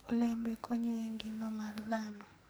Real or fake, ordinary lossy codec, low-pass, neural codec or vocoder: fake; none; none; codec, 44.1 kHz, 2.6 kbps, SNAC